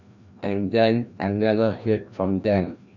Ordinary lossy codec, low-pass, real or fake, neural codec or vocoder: none; 7.2 kHz; fake; codec, 16 kHz, 1 kbps, FreqCodec, larger model